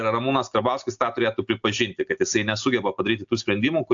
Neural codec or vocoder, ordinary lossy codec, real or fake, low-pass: none; AAC, 64 kbps; real; 7.2 kHz